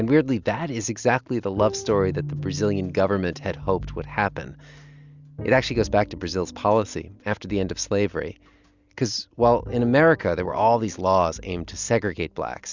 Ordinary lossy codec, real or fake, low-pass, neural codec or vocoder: Opus, 64 kbps; real; 7.2 kHz; none